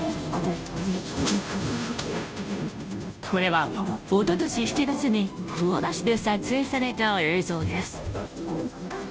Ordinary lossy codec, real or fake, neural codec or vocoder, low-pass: none; fake; codec, 16 kHz, 0.5 kbps, FunCodec, trained on Chinese and English, 25 frames a second; none